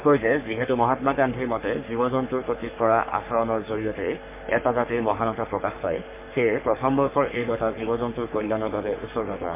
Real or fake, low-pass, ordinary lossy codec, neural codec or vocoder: fake; 3.6 kHz; MP3, 32 kbps; codec, 44.1 kHz, 3.4 kbps, Pupu-Codec